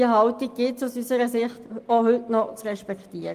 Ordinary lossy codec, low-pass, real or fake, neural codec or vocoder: Opus, 32 kbps; 14.4 kHz; real; none